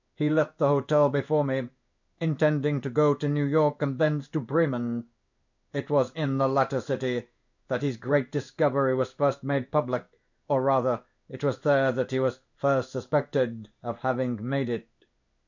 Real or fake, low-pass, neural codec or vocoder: fake; 7.2 kHz; codec, 16 kHz in and 24 kHz out, 1 kbps, XY-Tokenizer